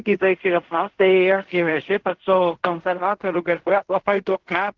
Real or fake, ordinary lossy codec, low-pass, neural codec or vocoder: fake; Opus, 16 kbps; 7.2 kHz; codec, 16 kHz in and 24 kHz out, 0.4 kbps, LongCat-Audio-Codec, fine tuned four codebook decoder